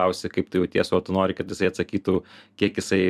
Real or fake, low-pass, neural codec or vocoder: fake; 14.4 kHz; vocoder, 44.1 kHz, 128 mel bands every 256 samples, BigVGAN v2